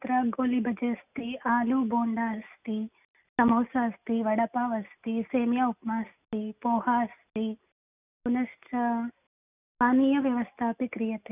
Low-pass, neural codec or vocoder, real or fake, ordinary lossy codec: 3.6 kHz; none; real; none